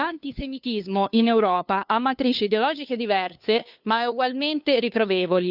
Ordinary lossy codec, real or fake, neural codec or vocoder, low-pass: Opus, 64 kbps; fake; codec, 16 kHz, 2 kbps, FunCodec, trained on LibriTTS, 25 frames a second; 5.4 kHz